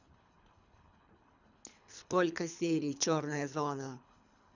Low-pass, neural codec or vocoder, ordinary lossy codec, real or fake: 7.2 kHz; codec, 24 kHz, 3 kbps, HILCodec; none; fake